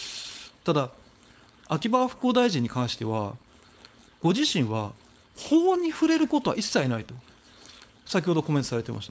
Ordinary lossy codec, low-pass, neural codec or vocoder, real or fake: none; none; codec, 16 kHz, 4.8 kbps, FACodec; fake